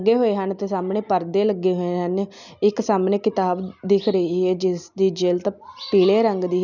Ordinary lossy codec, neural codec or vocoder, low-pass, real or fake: none; none; 7.2 kHz; real